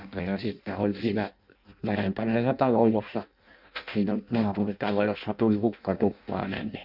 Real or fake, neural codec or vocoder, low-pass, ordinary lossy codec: fake; codec, 16 kHz in and 24 kHz out, 0.6 kbps, FireRedTTS-2 codec; 5.4 kHz; none